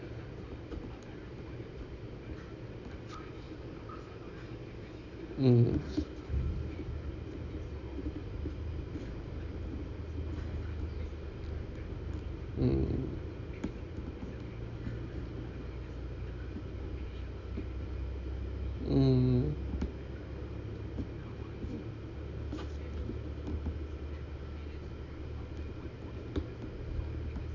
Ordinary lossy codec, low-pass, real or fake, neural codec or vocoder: none; 7.2 kHz; real; none